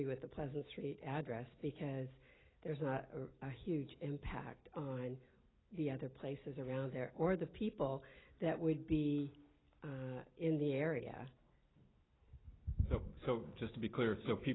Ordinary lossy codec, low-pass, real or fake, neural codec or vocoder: AAC, 16 kbps; 7.2 kHz; real; none